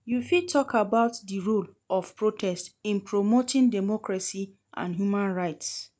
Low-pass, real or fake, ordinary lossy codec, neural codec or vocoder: none; real; none; none